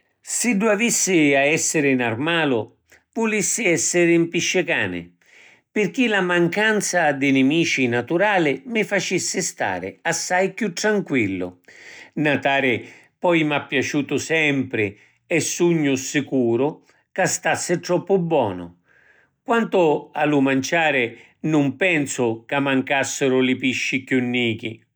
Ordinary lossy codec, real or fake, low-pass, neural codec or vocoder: none; real; none; none